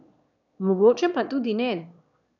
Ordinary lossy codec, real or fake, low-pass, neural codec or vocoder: none; fake; 7.2 kHz; autoencoder, 22.05 kHz, a latent of 192 numbers a frame, VITS, trained on one speaker